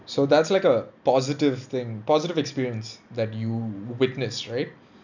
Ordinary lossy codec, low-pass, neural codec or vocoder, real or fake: none; 7.2 kHz; none; real